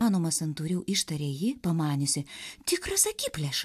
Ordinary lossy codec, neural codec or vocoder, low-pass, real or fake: AAC, 96 kbps; none; 14.4 kHz; real